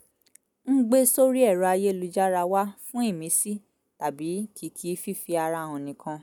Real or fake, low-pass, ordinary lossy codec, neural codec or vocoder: real; none; none; none